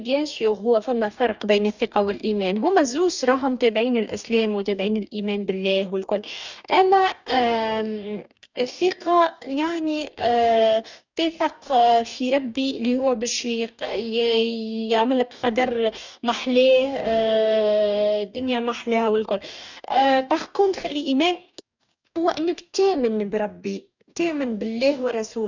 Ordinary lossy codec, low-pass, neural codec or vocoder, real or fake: none; 7.2 kHz; codec, 44.1 kHz, 2.6 kbps, DAC; fake